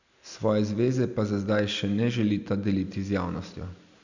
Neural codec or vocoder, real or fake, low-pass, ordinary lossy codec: none; real; 7.2 kHz; none